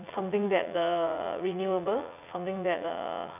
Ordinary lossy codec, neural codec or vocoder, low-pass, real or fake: none; vocoder, 44.1 kHz, 80 mel bands, Vocos; 3.6 kHz; fake